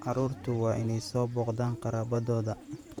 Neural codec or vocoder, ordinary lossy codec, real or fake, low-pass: vocoder, 48 kHz, 128 mel bands, Vocos; none; fake; 19.8 kHz